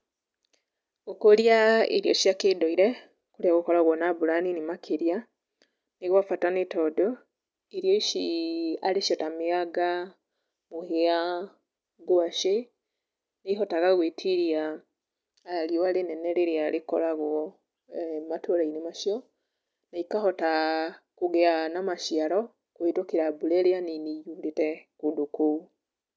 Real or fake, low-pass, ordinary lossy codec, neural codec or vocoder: real; none; none; none